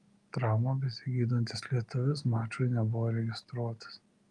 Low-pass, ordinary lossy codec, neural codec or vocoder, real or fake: 10.8 kHz; Opus, 32 kbps; none; real